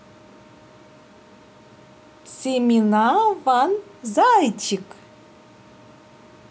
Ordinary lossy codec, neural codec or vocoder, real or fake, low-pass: none; none; real; none